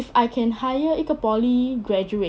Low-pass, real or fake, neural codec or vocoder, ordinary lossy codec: none; real; none; none